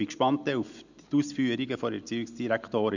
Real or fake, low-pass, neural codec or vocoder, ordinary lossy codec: real; 7.2 kHz; none; none